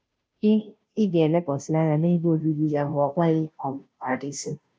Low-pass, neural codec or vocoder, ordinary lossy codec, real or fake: none; codec, 16 kHz, 0.5 kbps, FunCodec, trained on Chinese and English, 25 frames a second; none; fake